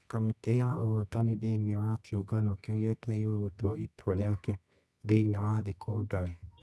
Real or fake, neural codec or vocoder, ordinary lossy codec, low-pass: fake; codec, 24 kHz, 0.9 kbps, WavTokenizer, medium music audio release; none; none